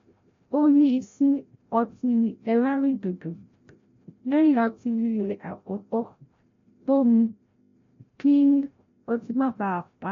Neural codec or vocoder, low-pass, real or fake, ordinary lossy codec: codec, 16 kHz, 0.5 kbps, FreqCodec, larger model; 7.2 kHz; fake; MP3, 48 kbps